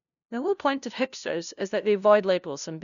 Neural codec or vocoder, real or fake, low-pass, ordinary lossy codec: codec, 16 kHz, 0.5 kbps, FunCodec, trained on LibriTTS, 25 frames a second; fake; 7.2 kHz; none